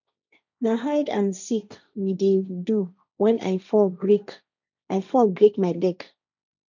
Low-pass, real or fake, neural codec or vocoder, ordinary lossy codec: 7.2 kHz; fake; codec, 16 kHz, 1.1 kbps, Voila-Tokenizer; none